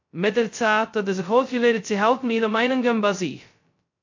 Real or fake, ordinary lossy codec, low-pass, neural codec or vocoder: fake; MP3, 48 kbps; 7.2 kHz; codec, 16 kHz, 0.2 kbps, FocalCodec